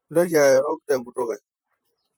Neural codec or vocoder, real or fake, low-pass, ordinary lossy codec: vocoder, 44.1 kHz, 128 mel bands, Pupu-Vocoder; fake; none; none